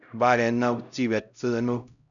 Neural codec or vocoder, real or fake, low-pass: codec, 16 kHz, 0.5 kbps, X-Codec, HuBERT features, trained on LibriSpeech; fake; 7.2 kHz